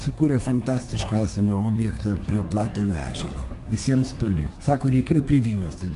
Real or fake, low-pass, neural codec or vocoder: fake; 10.8 kHz; codec, 24 kHz, 1 kbps, SNAC